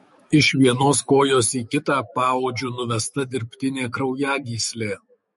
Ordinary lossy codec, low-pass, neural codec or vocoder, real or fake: MP3, 48 kbps; 19.8 kHz; autoencoder, 48 kHz, 128 numbers a frame, DAC-VAE, trained on Japanese speech; fake